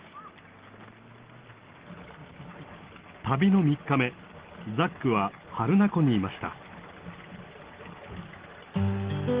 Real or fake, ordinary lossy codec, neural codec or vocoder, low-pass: real; Opus, 16 kbps; none; 3.6 kHz